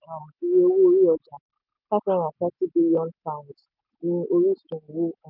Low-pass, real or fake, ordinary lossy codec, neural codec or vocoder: 5.4 kHz; real; none; none